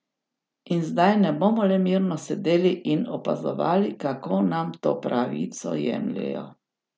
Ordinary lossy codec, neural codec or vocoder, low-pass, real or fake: none; none; none; real